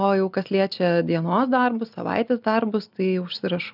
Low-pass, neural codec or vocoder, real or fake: 5.4 kHz; none; real